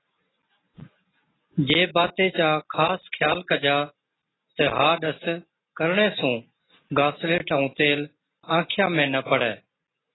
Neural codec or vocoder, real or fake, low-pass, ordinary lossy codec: none; real; 7.2 kHz; AAC, 16 kbps